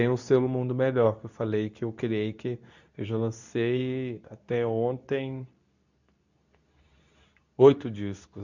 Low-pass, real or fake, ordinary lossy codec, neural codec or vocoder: 7.2 kHz; fake; none; codec, 24 kHz, 0.9 kbps, WavTokenizer, medium speech release version 2